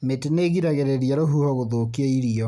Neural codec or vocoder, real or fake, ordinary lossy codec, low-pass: none; real; none; none